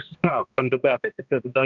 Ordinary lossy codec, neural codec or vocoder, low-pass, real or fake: Opus, 64 kbps; codec, 16 kHz, 1 kbps, X-Codec, HuBERT features, trained on balanced general audio; 7.2 kHz; fake